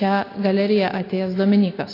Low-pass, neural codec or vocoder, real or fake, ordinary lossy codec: 5.4 kHz; none; real; AAC, 24 kbps